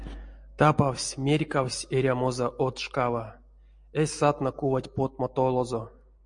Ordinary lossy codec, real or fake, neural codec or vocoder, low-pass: MP3, 48 kbps; real; none; 9.9 kHz